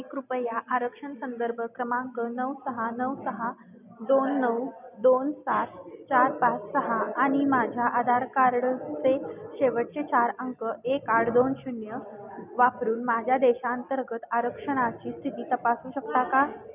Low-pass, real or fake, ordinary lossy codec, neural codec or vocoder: 3.6 kHz; real; none; none